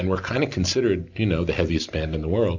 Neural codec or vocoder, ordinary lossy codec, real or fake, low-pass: none; MP3, 64 kbps; real; 7.2 kHz